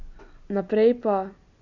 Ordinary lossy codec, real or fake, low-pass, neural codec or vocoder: none; real; 7.2 kHz; none